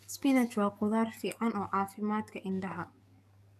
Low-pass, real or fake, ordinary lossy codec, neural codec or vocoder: 14.4 kHz; fake; none; codec, 44.1 kHz, 7.8 kbps, DAC